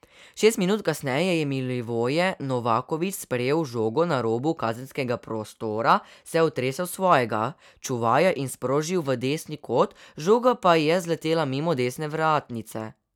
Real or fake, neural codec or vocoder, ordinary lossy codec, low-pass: real; none; none; 19.8 kHz